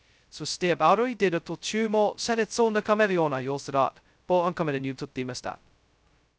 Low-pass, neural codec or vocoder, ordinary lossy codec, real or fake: none; codec, 16 kHz, 0.2 kbps, FocalCodec; none; fake